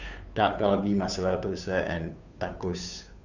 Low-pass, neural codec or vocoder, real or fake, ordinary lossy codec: 7.2 kHz; codec, 16 kHz, 2 kbps, FunCodec, trained on Chinese and English, 25 frames a second; fake; none